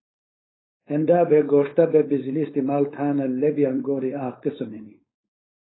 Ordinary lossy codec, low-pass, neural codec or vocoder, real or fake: AAC, 16 kbps; 7.2 kHz; codec, 16 kHz, 4.8 kbps, FACodec; fake